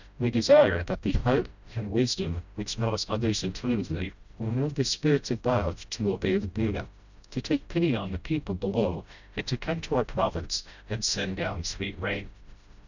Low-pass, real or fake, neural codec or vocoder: 7.2 kHz; fake; codec, 16 kHz, 0.5 kbps, FreqCodec, smaller model